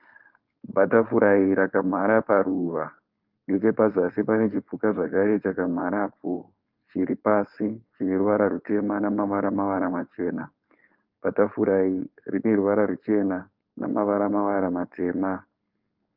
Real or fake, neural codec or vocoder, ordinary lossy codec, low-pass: fake; codec, 16 kHz, 4.8 kbps, FACodec; Opus, 32 kbps; 5.4 kHz